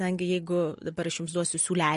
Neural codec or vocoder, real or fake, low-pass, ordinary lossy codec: none; real; 10.8 kHz; MP3, 48 kbps